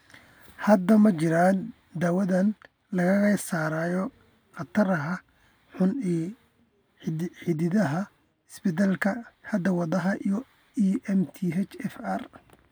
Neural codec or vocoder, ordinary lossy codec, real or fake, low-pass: none; none; real; none